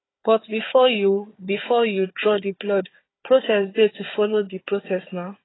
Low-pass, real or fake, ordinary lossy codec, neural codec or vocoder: 7.2 kHz; fake; AAC, 16 kbps; codec, 16 kHz, 4 kbps, FunCodec, trained on Chinese and English, 50 frames a second